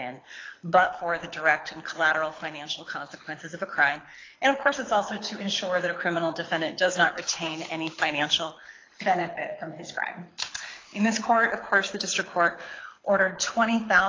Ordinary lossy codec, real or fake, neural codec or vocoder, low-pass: AAC, 32 kbps; fake; codec, 24 kHz, 6 kbps, HILCodec; 7.2 kHz